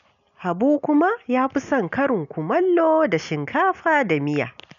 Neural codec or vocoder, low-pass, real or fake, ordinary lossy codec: none; 7.2 kHz; real; none